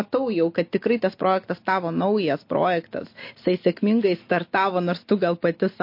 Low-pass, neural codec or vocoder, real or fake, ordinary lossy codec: 5.4 kHz; none; real; MP3, 32 kbps